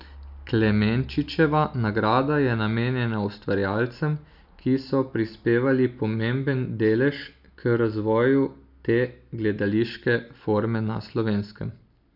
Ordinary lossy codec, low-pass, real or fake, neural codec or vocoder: none; 5.4 kHz; real; none